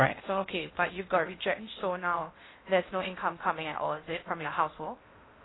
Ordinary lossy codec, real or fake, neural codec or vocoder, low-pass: AAC, 16 kbps; fake; codec, 16 kHz in and 24 kHz out, 0.6 kbps, FocalCodec, streaming, 2048 codes; 7.2 kHz